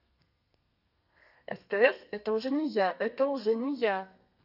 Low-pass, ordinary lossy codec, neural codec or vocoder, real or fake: 5.4 kHz; none; codec, 32 kHz, 1.9 kbps, SNAC; fake